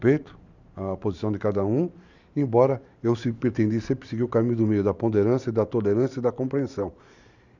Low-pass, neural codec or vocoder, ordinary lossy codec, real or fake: 7.2 kHz; vocoder, 44.1 kHz, 128 mel bands every 512 samples, BigVGAN v2; none; fake